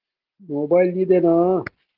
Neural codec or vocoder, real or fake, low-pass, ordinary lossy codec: none; real; 5.4 kHz; Opus, 16 kbps